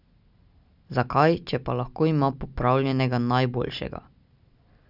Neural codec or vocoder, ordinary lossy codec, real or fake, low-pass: none; none; real; 5.4 kHz